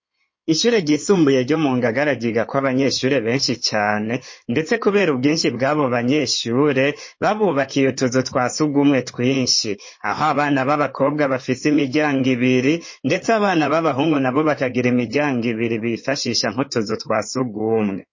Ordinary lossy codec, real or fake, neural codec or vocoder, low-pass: MP3, 32 kbps; fake; codec, 16 kHz in and 24 kHz out, 2.2 kbps, FireRedTTS-2 codec; 7.2 kHz